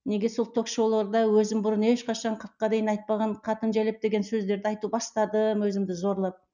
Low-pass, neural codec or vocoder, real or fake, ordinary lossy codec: 7.2 kHz; none; real; none